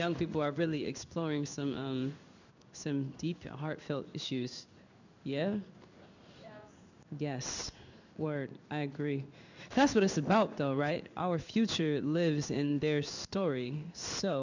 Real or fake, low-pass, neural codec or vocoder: fake; 7.2 kHz; codec, 16 kHz in and 24 kHz out, 1 kbps, XY-Tokenizer